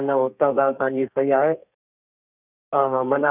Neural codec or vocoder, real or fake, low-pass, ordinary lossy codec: codec, 32 kHz, 1.9 kbps, SNAC; fake; 3.6 kHz; none